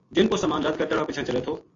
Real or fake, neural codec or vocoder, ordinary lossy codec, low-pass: real; none; AAC, 32 kbps; 7.2 kHz